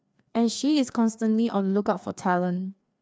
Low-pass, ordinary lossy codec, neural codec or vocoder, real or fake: none; none; codec, 16 kHz, 2 kbps, FreqCodec, larger model; fake